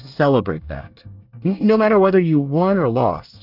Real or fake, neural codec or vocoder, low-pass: fake; codec, 24 kHz, 1 kbps, SNAC; 5.4 kHz